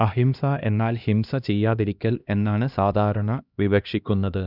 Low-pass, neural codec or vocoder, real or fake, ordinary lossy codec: 5.4 kHz; codec, 16 kHz, 1 kbps, X-Codec, HuBERT features, trained on LibriSpeech; fake; none